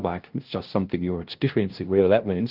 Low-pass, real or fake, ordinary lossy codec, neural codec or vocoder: 5.4 kHz; fake; Opus, 16 kbps; codec, 16 kHz, 0.5 kbps, FunCodec, trained on LibriTTS, 25 frames a second